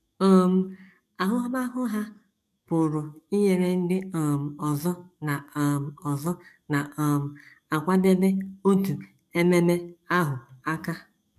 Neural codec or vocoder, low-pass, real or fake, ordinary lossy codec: codec, 44.1 kHz, 7.8 kbps, DAC; 14.4 kHz; fake; MP3, 96 kbps